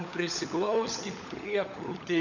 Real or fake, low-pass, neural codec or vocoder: fake; 7.2 kHz; codec, 16 kHz, 16 kbps, FunCodec, trained on LibriTTS, 50 frames a second